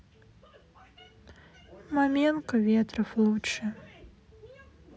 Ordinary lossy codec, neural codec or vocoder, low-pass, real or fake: none; none; none; real